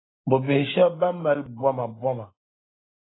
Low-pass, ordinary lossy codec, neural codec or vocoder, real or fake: 7.2 kHz; AAC, 16 kbps; none; real